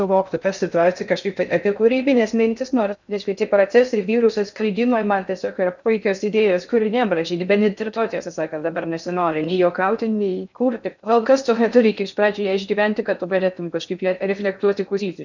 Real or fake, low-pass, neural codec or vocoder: fake; 7.2 kHz; codec, 16 kHz in and 24 kHz out, 0.6 kbps, FocalCodec, streaming, 2048 codes